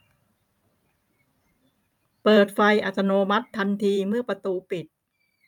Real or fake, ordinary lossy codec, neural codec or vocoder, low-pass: fake; none; vocoder, 44.1 kHz, 128 mel bands every 512 samples, BigVGAN v2; 19.8 kHz